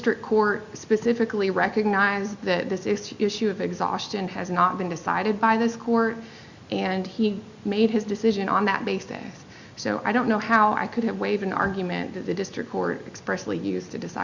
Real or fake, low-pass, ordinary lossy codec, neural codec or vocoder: real; 7.2 kHz; Opus, 64 kbps; none